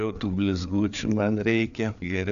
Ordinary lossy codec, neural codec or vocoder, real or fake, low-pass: MP3, 96 kbps; codec, 16 kHz, 4 kbps, FreqCodec, larger model; fake; 7.2 kHz